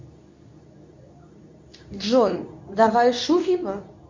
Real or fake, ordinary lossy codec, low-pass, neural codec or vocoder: fake; MP3, 64 kbps; 7.2 kHz; codec, 24 kHz, 0.9 kbps, WavTokenizer, medium speech release version 2